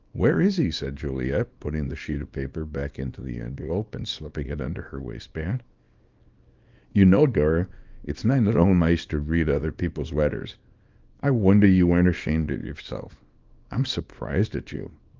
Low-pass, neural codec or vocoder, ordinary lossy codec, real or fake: 7.2 kHz; codec, 24 kHz, 0.9 kbps, WavTokenizer, small release; Opus, 32 kbps; fake